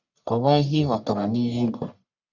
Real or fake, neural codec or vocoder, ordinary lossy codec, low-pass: fake; codec, 44.1 kHz, 1.7 kbps, Pupu-Codec; none; 7.2 kHz